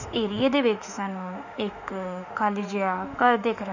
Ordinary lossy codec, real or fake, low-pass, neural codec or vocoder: none; fake; 7.2 kHz; autoencoder, 48 kHz, 32 numbers a frame, DAC-VAE, trained on Japanese speech